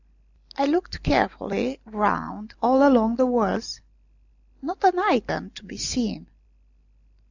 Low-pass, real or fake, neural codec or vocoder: 7.2 kHz; real; none